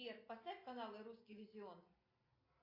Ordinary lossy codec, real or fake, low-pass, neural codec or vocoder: AAC, 32 kbps; real; 5.4 kHz; none